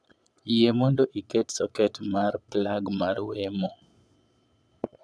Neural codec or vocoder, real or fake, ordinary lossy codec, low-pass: vocoder, 22.05 kHz, 80 mel bands, Vocos; fake; none; none